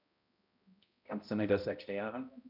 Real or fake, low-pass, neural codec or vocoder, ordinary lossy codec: fake; 5.4 kHz; codec, 16 kHz, 0.5 kbps, X-Codec, HuBERT features, trained on balanced general audio; none